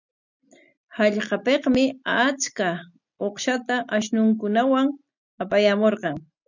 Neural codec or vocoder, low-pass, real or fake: none; 7.2 kHz; real